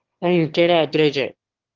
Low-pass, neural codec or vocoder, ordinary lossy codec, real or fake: 7.2 kHz; autoencoder, 22.05 kHz, a latent of 192 numbers a frame, VITS, trained on one speaker; Opus, 16 kbps; fake